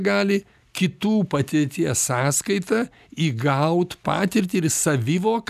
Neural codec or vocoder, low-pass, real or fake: none; 14.4 kHz; real